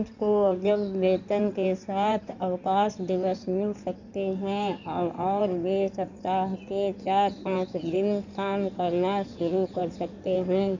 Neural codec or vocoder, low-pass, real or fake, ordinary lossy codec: codec, 16 kHz in and 24 kHz out, 2.2 kbps, FireRedTTS-2 codec; 7.2 kHz; fake; none